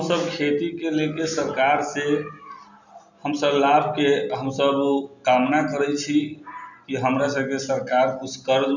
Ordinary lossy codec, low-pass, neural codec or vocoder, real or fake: none; 7.2 kHz; none; real